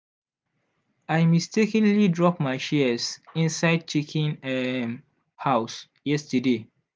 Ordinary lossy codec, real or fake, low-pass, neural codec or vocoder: none; real; none; none